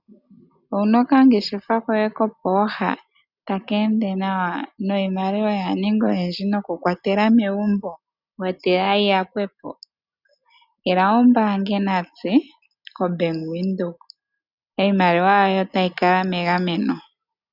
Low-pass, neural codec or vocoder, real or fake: 5.4 kHz; none; real